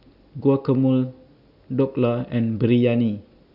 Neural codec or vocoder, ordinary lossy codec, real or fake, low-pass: none; none; real; 5.4 kHz